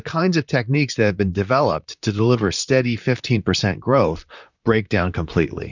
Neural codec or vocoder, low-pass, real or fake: vocoder, 44.1 kHz, 128 mel bands, Pupu-Vocoder; 7.2 kHz; fake